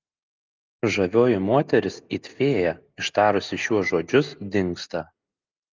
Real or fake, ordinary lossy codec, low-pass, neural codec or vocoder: real; Opus, 24 kbps; 7.2 kHz; none